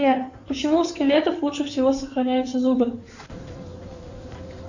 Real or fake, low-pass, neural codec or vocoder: fake; 7.2 kHz; codec, 16 kHz in and 24 kHz out, 2.2 kbps, FireRedTTS-2 codec